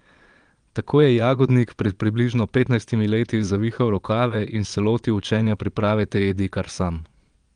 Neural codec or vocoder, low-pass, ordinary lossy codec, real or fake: vocoder, 22.05 kHz, 80 mel bands, Vocos; 9.9 kHz; Opus, 32 kbps; fake